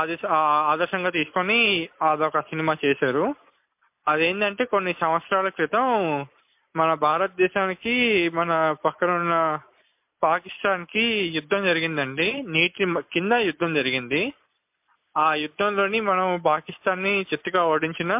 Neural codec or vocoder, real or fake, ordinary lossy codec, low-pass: none; real; MP3, 32 kbps; 3.6 kHz